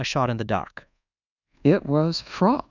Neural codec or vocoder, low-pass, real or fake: codec, 24 kHz, 1.2 kbps, DualCodec; 7.2 kHz; fake